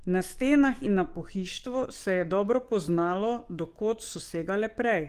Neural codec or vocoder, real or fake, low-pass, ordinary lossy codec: codec, 44.1 kHz, 7.8 kbps, DAC; fake; 14.4 kHz; Opus, 24 kbps